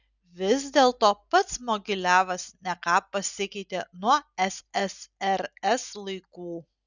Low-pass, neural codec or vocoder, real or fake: 7.2 kHz; none; real